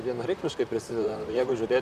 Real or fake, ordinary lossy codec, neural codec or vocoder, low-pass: fake; AAC, 96 kbps; vocoder, 44.1 kHz, 128 mel bands, Pupu-Vocoder; 14.4 kHz